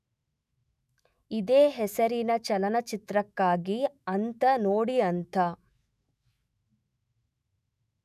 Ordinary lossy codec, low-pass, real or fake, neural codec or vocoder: none; 14.4 kHz; fake; autoencoder, 48 kHz, 128 numbers a frame, DAC-VAE, trained on Japanese speech